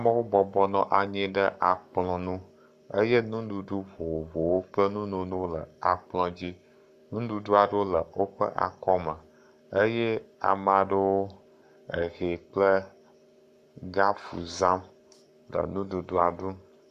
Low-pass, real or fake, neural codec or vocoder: 14.4 kHz; fake; codec, 44.1 kHz, 7.8 kbps, Pupu-Codec